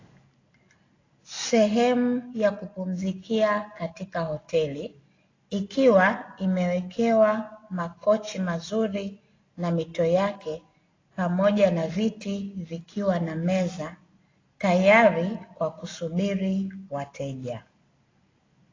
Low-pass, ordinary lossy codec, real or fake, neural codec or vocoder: 7.2 kHz; AAC, 32 kbps; real; none